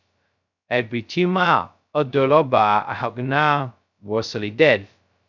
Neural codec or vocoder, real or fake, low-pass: codec, 16 kHz, 0.2 kbps, FocalCodec; fake; 7.2 kHz